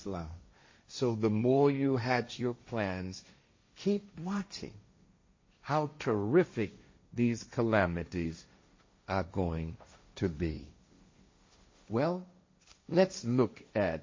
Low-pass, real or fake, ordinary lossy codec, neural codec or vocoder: 7.2 kHz; fake; MP3, 32 kbps; codec, 16 kHz, 1.1 kbps, Voila-Tokenizer